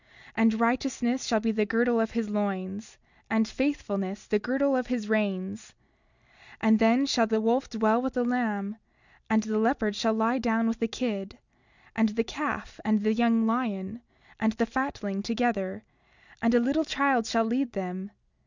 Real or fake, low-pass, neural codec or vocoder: real; 7.2 kHz; none